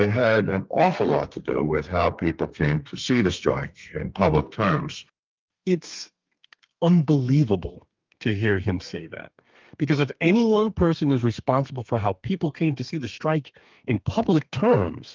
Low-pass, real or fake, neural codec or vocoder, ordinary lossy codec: 7.2 kHz; fake; codec, 32 kHz, 1.9 kbps, SNAC; Opus, 24 kbps